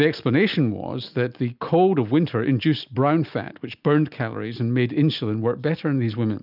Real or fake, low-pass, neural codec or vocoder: real; 5.4 kHz; none